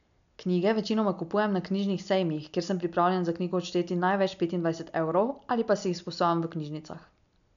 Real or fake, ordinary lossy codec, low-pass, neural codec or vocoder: real; none; 7.2 kHz; none